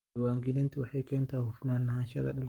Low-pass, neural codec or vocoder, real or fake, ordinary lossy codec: 19.8 kHz; codec, 44.1 kHz, 7.8 kbps, Pupu-Codec; fake; Opus, 32 kbps